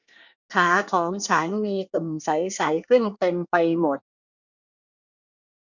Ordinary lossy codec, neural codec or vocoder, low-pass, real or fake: none; codec, 24 kHz, 1 kbps, SNAC; 7.2 kHz; fake